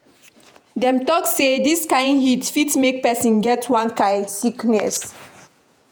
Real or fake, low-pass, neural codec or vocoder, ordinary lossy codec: fake; none; vocoder, 48 kHz, 128 mel bands, Vocos; none